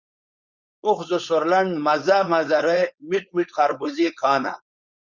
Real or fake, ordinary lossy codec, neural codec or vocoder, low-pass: fake; Opus, 64 kbps; codec, 16 kHz, 4.8 kbps, FACodec; 7.2 kHz